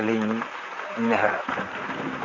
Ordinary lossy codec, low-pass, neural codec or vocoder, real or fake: none; 7.2 kHz; vocoder, 44.1 kHz, 128 mel bands, Pupu-Vocoder; fake